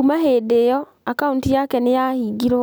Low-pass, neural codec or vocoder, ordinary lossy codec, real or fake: none; none; none; real